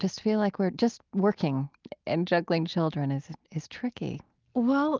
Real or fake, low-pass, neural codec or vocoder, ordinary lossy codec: real; 7.2 kHz; none; Opus, 32 kbps